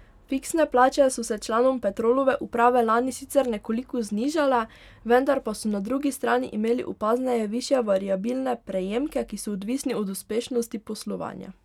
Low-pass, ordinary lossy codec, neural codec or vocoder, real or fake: 19.8 kHz; none; none; real